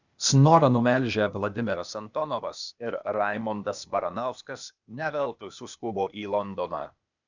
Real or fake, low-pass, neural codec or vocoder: fake; 7.2 kHz; codec, 16 kHz, 0.8 kbps, ZipCodec